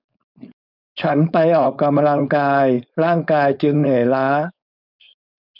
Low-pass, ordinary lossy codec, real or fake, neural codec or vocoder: 5.4 kHz; none; fake; codec, 16 kHz, 4.8 kbps, FACodec